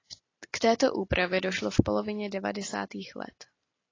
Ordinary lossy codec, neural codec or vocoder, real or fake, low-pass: AAC, 32 kbps; none; real; 7.2 kHz